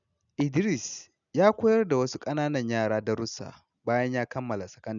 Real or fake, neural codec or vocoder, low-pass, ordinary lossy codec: real; none; 7.2 kHz; none